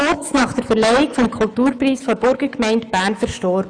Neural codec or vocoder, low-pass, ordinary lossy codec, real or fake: autoencoder, 48 kHz, 128 numbers a frame, DAC-VAE, trained on Japanese speech; 9.9 kHz; none; fake